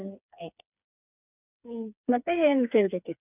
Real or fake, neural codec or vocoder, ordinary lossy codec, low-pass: fake; codec, 16 kHz, 4 kbps, FreqCodec, smaller model; none; 3.6 kHz